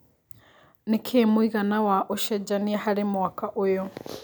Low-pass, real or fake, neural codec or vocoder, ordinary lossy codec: none; real; none; none